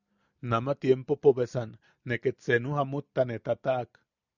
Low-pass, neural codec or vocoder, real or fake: 7.2 kHz; none; real